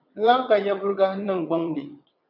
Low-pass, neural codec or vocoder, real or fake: 5.4 kHz; vocoder, 22.05 kHz, 80 mel bands, WaveNeXt; fake